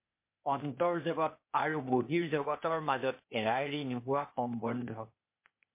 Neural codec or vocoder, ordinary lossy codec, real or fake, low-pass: codec, 16 kHz, 0.8 kbps, ZipCodec; MP3, 24 kbps; fake; 3.6 kHz